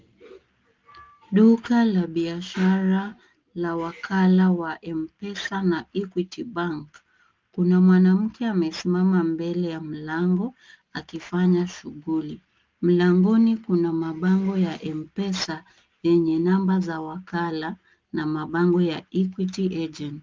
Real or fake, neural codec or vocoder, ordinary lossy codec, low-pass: real; none; Opus, 32 kbps; 7.2 kHz